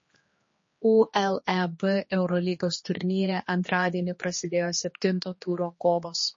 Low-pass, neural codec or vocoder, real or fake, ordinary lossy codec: 7.2 kHz; codec, 16 kHz, 2 kbps, X-Codec, HuBERT features, trained on general audio; fake; MP3, 32 kbps